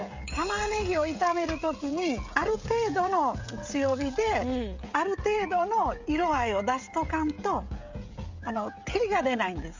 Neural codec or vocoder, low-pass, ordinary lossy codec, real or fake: codec, 16 kHz, 16 kbps, FreqCodec, smaller model; 7.2 kHz; MP3, 64 kbps; fake